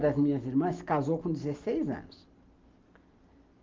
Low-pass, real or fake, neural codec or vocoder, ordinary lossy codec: 7.2 kHz; real; none; Opus, 24 kbps